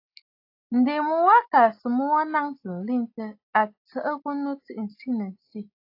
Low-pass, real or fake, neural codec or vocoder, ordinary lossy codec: 5.4 kHz; real; none; AAC, 32 kbps